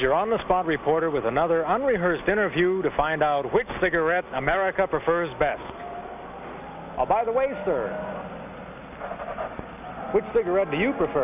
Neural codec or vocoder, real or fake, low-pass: none; real; 3.6 kHz